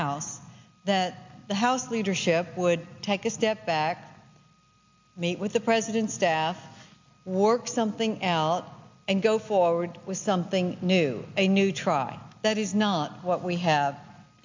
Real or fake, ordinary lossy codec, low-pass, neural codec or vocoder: real; MP3, 64 kbps; 7.2 kHz; none